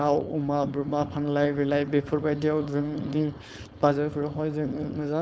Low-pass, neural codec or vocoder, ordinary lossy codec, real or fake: none; codec, 16 kHz, 4.8 kbps, FACodec; none; fake